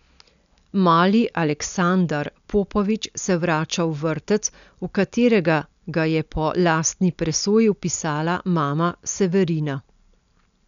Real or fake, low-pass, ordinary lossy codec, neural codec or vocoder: real; 7.2 kHz; none; none